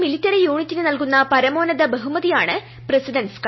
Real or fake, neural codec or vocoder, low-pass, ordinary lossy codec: real; none; 7.2 kHz; MP3, 24 kbps